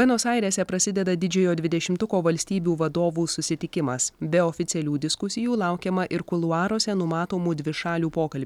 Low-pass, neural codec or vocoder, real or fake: 19.8 kHz; none; real